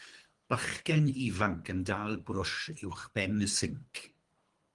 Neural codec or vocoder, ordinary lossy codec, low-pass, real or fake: codec, 24 kHz, 3 kbps, HILCodec; Opus, 24 kbps; 10.8 kHz; fake